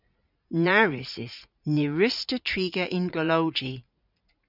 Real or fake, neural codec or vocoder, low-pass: fake; vocoder, 44.1 kHz, 80 mel bands, Vocos; 5.4 kHz